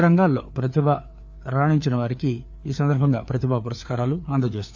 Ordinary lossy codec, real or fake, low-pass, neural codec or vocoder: none; fake; none; codec, 16 kHz, 4 kbps, FreqCodec, larger model